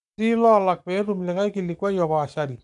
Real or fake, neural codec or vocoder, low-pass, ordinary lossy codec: fake; codec, 44.1 kHz, 7.8 kbps, Pupu-Codec; 10.8 kHz; none